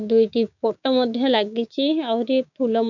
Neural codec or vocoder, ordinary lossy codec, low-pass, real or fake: codec, 16 kHz, 6 kbps, DAC; none; 7.2 kHz; fake